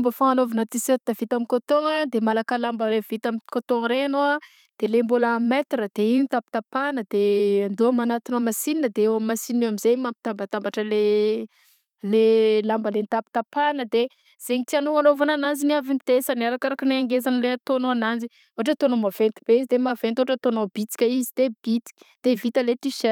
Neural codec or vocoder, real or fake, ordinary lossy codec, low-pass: vocoder, 44.1 kHz, 128 mel bands every 512 samples, BigVGAN v2; fake; none; 19.8 kHz